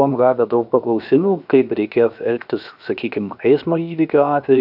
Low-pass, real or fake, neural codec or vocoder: 5.4 kHz; fake; codec, 16 kHz, 0.7 kbps, FocalCodec